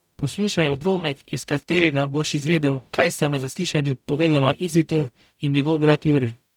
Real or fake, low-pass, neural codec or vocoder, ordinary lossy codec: fake; 19.8 kHz; codec, 44.1 kHz, 0.9 kbps, DAC; none